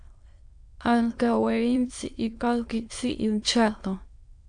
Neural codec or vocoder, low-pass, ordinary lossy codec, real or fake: autoencoder, 22.05 kHz, a latent of 192 numbers a frame, VITS, trained on many speakers; 9.9 kHz; AAC, 64 kbps; fake